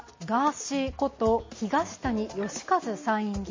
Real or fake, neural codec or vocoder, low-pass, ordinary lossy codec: real; none; 7.2 kHz; MP3, 32 kbps